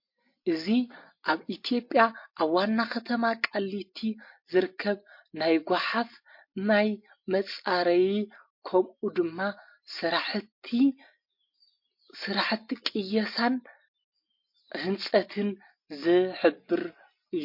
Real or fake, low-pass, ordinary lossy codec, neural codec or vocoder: real; 5.4 kHz; MP3, 32 kbps; none